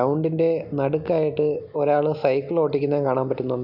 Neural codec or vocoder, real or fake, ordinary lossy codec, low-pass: none; real; none; 5.4 kHz